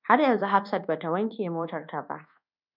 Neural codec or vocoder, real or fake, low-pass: codec, 24 kHz, 1.2 kbps, DualCodec; fake; 5.4 kHz